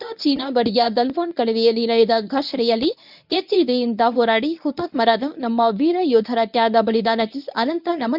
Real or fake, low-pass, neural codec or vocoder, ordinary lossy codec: fake; 5.4 kHz; codec, 24 kHz, 0.9 kbps, WavTokenizer, medium speech release version 1; none